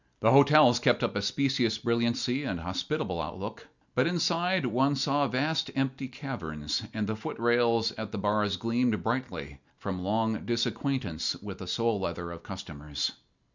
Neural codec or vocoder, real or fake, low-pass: none; real; 7.2 kHz